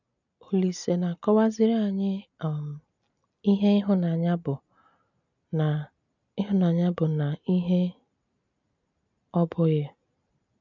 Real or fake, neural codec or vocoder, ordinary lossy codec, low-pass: real; none; none; 7.2 kHz